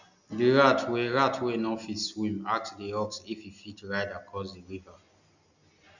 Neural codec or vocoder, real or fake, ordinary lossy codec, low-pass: none; real; none; 7.2 kHz